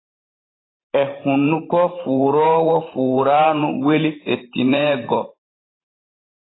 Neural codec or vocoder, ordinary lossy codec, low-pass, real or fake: vocoder, 44.1 kHz, 128 mel bands every 512 samples, BigVGAN v2; AAC, 16 kbps; 7.2 kHz; fake